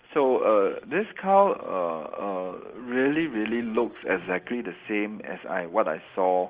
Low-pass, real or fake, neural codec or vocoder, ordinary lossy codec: 3.6 kHz; real; none; Opus, 16 kbps